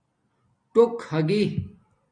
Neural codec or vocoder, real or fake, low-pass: none; real; 9.9 kHz